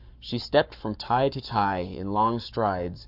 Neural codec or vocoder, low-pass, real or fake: codec, 44.1 kHz, 7.8 kbps, Pupu-Codec; 5.4 kHz; fake